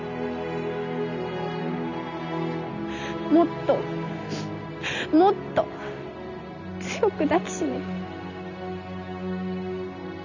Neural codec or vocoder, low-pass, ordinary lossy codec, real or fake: none; 7.2 kHz; none; real